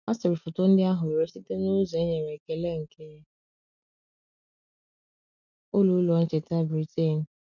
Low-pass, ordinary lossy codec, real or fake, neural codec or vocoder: 7.2 kHz; none; real; none